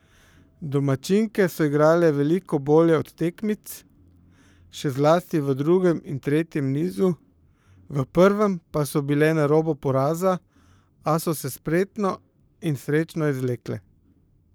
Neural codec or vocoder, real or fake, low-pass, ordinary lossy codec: codec, 44.1 kHz, 7.8 kbps, Pupu-Codec; fake; none; none